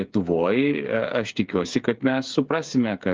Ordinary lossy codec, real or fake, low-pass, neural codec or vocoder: Opus, 32 kbps; fake; 7.2 kHz; codec, 16 kHz, 8 kbps, FreqCodec, smaller model